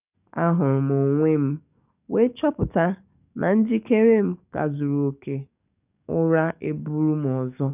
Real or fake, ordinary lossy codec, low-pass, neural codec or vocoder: fake; none; 3.6 kHz; codec, 44.1 kHz, 7.8 kbps, DAC